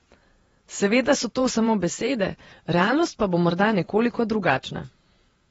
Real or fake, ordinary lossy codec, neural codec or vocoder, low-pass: fake; AAC, 24 kbps; vocoder, 48 kHz, 128 mel bands, Vocos; 19.8 kHz